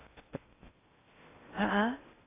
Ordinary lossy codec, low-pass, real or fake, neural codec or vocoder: AAC, 16 kbps; 3.6 kHz; fake; codec, 16 kHz in and 24 kHz out, 0.8 kbps, FocalCodec, streaming, 65536 codes